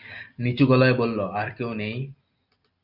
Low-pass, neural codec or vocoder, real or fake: 5.4 kHz; none; real